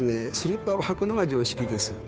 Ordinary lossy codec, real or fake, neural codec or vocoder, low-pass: none; fake; codec, 16 kHz, 2 kbps, FunCodec, trained on Chinese and English, 25 frames a second; none